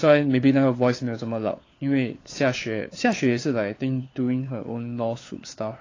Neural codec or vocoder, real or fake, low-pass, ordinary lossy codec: codec, 16 kHz, 4 kbps, FunCodec, trained on LibriTTS, 50 frames a second; fake; 7.2 kHz; AAC, 32 kbps